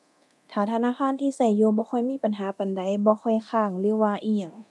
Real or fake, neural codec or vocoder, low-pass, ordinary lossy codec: fake; codec, 24 kHz, 0.9 kbps, DualCodec; none; none